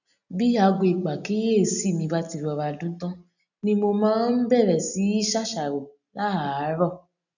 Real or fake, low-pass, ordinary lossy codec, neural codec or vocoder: real; 7.2 kHz; none; none